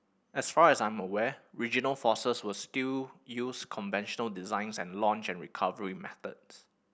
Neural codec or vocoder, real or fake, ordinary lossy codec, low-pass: none; real; none; none